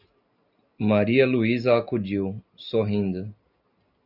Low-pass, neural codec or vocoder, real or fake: 5.4 kHz; none; real